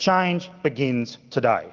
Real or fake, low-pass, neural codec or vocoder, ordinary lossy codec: real; 7.2 kHz; none; Opus, 32 kbps